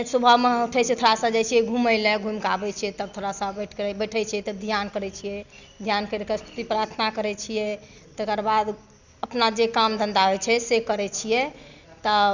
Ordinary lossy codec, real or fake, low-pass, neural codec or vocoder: none; real; 7.2 kHz; none